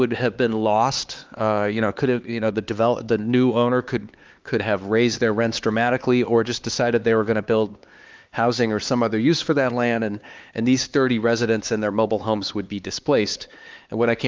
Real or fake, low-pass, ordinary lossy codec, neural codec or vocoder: fake; 7.2 kHz; Opus, 24 kbps; codec, 16 kHz, 4 kbps, X-Codec, HuBERT features, trained on LibriSpeech